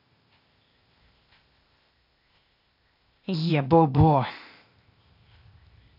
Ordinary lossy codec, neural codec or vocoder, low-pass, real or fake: none; codec, 16 kHz, 0.8 kbps, ZipCodec; 5.4 kHz; fake